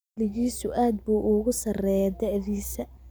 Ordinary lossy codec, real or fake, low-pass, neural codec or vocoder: none; real; none; none